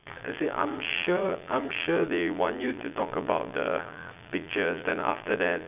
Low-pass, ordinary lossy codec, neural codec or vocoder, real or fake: 3.6 kHz; none; vocoder, 22.05 kHz, 80 mel bands, Vocos; fake